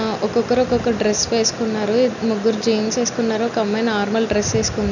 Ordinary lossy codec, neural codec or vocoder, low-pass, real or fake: none; none; 7.2 kHz; real